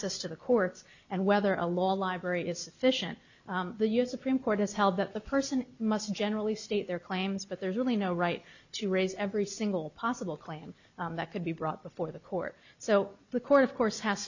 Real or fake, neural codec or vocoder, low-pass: real; none; 7.2 kHz